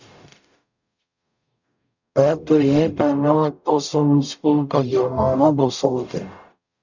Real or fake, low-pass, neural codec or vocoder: fake; 7.2 kHz; codec, 44.1 kHz, 0.9 kbps, DAC